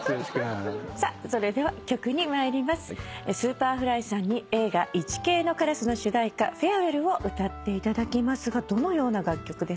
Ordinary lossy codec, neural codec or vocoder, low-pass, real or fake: none; none; none; real